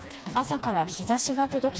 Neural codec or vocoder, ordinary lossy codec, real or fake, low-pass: codec, 16 kHz, 2 kbps, FreqCodec, smaller model; none; fake; none